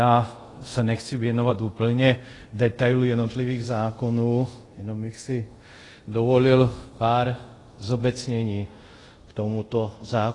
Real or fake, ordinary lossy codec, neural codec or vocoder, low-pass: fake; AAC, 32 kbps; codec, 24 kHz, 0.5 kbps, DualCodec; 10.8 kHz